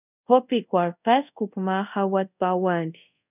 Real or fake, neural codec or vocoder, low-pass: fake; codec, 24 kHz, 0.5 kbps, DualCodec; 3.6 kHz